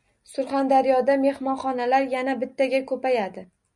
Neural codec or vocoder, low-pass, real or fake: none; 10.8 kHz; real